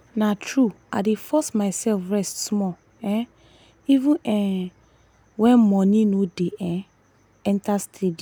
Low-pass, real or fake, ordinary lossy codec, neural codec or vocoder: none; real; none; none